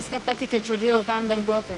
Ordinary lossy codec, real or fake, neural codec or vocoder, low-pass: MP3, 96 kbps; fake; codec, 24 kHz, 0.9 kbps, WavTokenizer, medium music audio release; 10.8 kHz